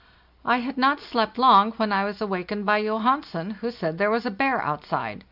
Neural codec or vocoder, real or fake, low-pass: none; real; 5.4 kHz